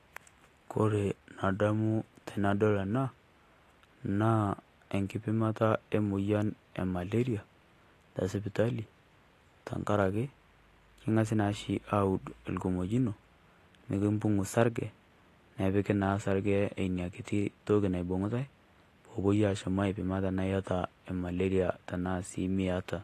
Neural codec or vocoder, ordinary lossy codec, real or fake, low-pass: none; AAC, 48 kbps; real; 14.4 kHz